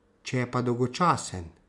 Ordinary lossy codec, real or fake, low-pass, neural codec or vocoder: none; real; 10.8 kHz; none